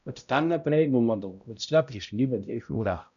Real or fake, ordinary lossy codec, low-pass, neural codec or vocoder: fake; none; 7.2 kHz; codec, 16 kHz, 0.5 kbps, X-Codec, HuBERT features, trained on balanced general audio